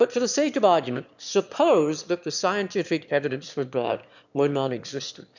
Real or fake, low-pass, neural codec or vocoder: fake; 7.2 kHz; autoencoder, 22.05 kHz, a latent of 192 numbers a frame, VITS, trained on one speaker